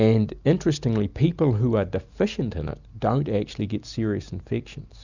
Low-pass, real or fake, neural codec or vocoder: 7.2 kHz; real; none